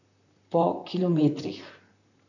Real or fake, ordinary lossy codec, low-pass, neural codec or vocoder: fake; none; 7.2 kHz; vocoder, 44.1 kHz, 128 mel bands, Pupu-Vocoder